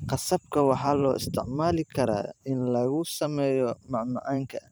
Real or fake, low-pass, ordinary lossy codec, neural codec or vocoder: fake; none; none; vocoder, 44.1 kHz, 128 mel bands every 512 samples, BigVGAN v2